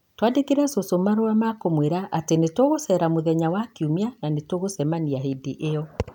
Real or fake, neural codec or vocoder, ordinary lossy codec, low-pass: real; none; none; 19.8 kHz